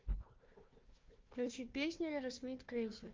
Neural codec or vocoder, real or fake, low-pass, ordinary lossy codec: codec, 16 kHz, 1 kbps, FunCodec, trained on Chinese and English, 50 frames a second; fake; 7.2 kHz; Opus, 24 kbps